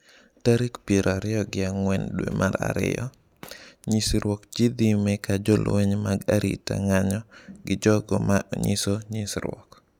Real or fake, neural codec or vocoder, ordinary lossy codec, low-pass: real; none; none; 19.8 kHz